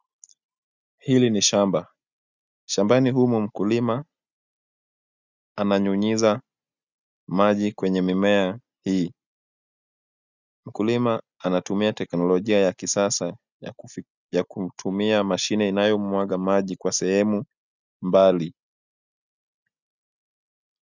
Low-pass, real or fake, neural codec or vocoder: 7.2 kHz; real; none